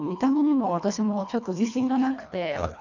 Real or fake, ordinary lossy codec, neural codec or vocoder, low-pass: fake; none; codec, 24 kHz, 1.5 kbps, HILCodec; 7.2 kHz